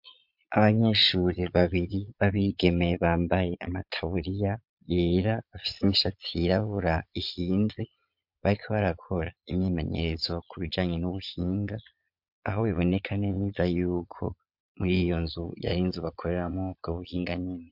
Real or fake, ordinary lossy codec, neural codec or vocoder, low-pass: fake; MP3, 48 kbps; vocoder, 22.05 kHz, 80 mel bands, Vocos; 5.4 kHz